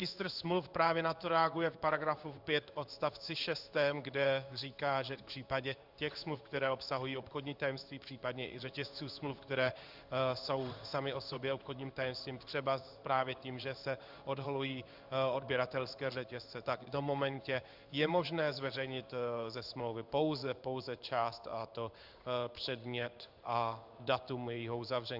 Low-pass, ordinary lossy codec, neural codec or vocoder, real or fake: 5.4 kHz; Opus, 64 kbps; codec, 16 kHz in and 24 kHz out, 1 kbps, XY-Tokenizer; fake